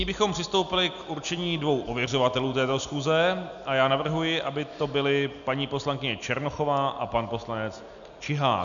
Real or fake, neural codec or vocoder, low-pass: real; none; 7.2 kHz